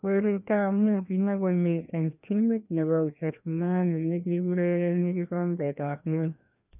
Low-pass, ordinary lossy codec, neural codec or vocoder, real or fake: 3.6 kHz; none; codec, 16 kHz, 1 kbps, FreqCodec, larger model; fake